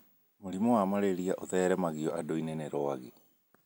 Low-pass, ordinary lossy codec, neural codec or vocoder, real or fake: none; none; none; real